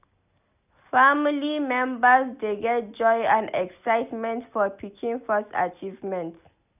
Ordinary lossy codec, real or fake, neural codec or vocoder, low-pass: none; real; none; 3.6 kHz